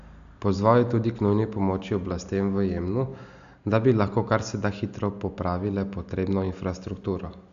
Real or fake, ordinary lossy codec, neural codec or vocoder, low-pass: real; AAC, 96 kbps; none; 7.2 kHz